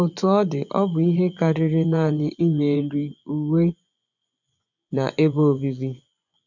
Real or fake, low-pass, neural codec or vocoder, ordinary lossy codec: fake; 7.2 kHz; vocoder, 24 kHz, 100 mel bands, Vocos; none